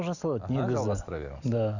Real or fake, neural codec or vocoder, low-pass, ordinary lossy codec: real; none; 7.2 kHz; none